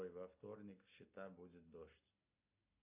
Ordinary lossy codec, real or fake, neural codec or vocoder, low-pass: AAC, 32 kbps; real; none; 3.6 kHz